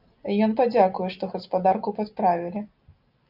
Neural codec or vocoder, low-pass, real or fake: none; 5.4 kHz; real